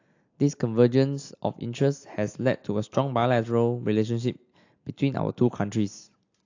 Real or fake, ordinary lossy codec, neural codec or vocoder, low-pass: real; AAC, 48 kbps; none; 7.2 kHz